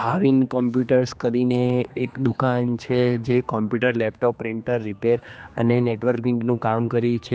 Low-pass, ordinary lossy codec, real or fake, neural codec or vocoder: none; none; fake; codec, 16 kHz, 2 kbps, X-Codec, HuBERT features, trained on general audio